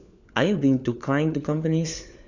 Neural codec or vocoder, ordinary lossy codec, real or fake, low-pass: codec, 16 kHz, 4 kbps, FunCodec, trained on LibriTTS, 50 frames a second; none; fake; 7.2 kHz